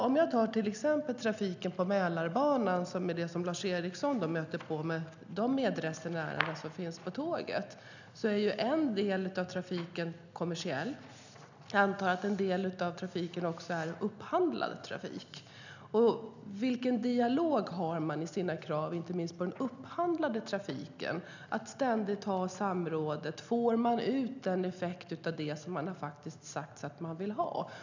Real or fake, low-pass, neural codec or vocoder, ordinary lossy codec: real; 7.2 kHz; none; none